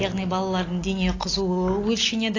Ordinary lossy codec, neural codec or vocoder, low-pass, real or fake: AAC, 32 kbps; none; 7.2 kHz; real